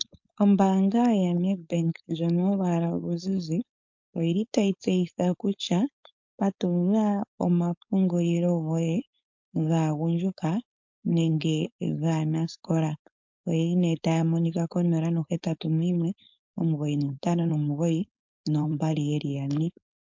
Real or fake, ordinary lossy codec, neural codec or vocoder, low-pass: fake; MP3, 48 kbps; codec, 16 kHz, 4.8 kbps, FACodec; 7.2 kHz